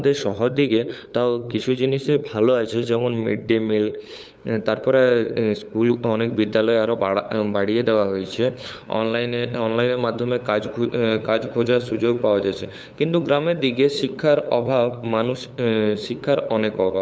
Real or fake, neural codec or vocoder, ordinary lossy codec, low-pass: fake; codec, 16 kHz, 8 kbps, FunCodec, trained on LibriTTS, 25 frames a second; none; none